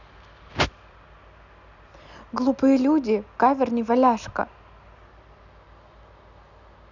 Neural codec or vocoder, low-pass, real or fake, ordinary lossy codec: none; 7.2 kHz; real; none